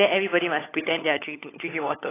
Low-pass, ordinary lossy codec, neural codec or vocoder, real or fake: 3.6 kHz; AAC, 16 kbps; codec, 16 kHz, 16 kbps, FunCodec, trained on LibriTTS, 50 frames a second; fake